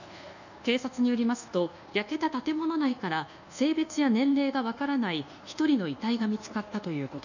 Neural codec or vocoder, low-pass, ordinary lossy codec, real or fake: codec, 24 kHz, 1.2 kbps, DualCodec; 7.2 kHz; none; fake